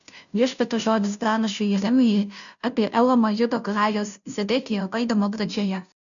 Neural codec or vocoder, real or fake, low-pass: codec, 16 kHz, 0.5 kbps, FunCodec, trained on Chinese and English, 25 frames a second; fake; 7.2 kHz